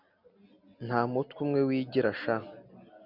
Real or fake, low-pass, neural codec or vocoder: real; 5.4 kHz; none